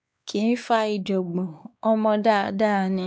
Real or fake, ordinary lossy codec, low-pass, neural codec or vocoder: fake; none; none; codec, 16 kHz, 2 kbps, X-Codec, WavLM features, trained on Multilingual LibriSpeech